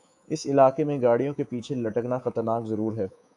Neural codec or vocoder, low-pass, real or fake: codec, 24 kHz, 3.1 kbps, DualCodec; 10.8 kHz; fake